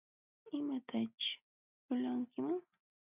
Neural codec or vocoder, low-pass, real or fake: none; 3.6 kHz; real